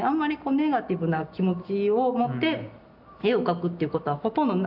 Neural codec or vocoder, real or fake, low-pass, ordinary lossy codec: none; real; 5.4 kHz; none